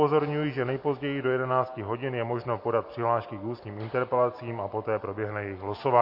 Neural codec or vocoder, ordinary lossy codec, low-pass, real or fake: none; MP3, 32 kbps; 5.4 kHz; real